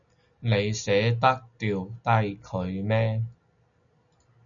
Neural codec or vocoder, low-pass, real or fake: none; 7.2 kHz; real